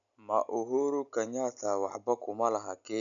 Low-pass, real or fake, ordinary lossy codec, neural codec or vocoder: 7.2 kHz; real; none; none